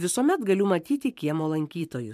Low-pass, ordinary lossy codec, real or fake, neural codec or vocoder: 14.4 kHz; MP3, 96 kbps; fake; vocoder, 44.1 kHz, 128 mel bands every 512 samples, BigVGAN v2